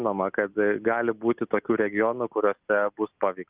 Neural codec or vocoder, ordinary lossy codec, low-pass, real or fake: none; Opus, 24 kbps; 3.6 kHz; real